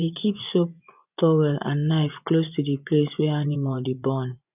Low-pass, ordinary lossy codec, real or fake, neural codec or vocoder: 3.6 kHz; none; fake; vocoder, 24 kHz, 100 mel bands, Vocos